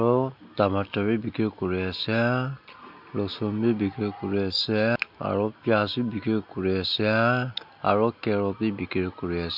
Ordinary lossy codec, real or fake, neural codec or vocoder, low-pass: MP3, 48 kbps; real; none; 5.4 kHz